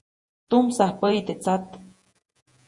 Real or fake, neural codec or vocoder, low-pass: fake; vocoder, 48 kHz, 128 mel bands, Vocos; 10.8 kHz